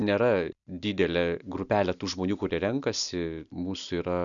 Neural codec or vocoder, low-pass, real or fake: none; 7.2 kHz; real